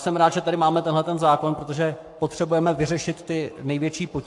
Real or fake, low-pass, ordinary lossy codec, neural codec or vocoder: fake; 10.8 kHz; AAC, 64 kbps; codec, 44.1 kHz, 7.8 kbps, Pupu-Codec